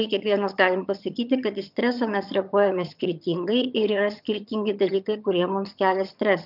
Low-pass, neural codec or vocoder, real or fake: 5.4 kHz; vocoder, 22.05 kHz, 80 mel bands, HiFi-GAN; fake